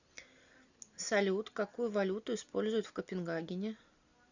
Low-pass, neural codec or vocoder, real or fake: 7.2 kHz; none; real